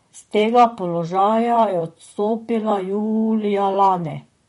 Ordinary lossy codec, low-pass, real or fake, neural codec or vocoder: MP3, 48 kbps; 19.8 kHz; fake; vocoder, 44.1 kHz, 128 mel bands, Pupu-Vocoder